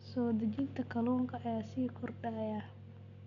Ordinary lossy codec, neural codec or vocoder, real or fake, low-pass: none; none; real; 7.2 kHz